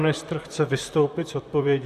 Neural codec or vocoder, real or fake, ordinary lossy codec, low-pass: vocoder, 44.1 kHz, 128 mel bands, Pupu-Vocoder; fake; Opus, 64 kbps; 14.4 kHz